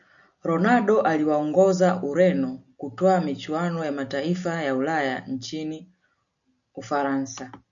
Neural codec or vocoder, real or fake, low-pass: none; real; 7.2 kHz